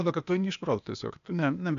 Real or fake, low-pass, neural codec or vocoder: fake; 7.2 kHz; codec, 16 kHz, 0.8 kbps, ZipCodec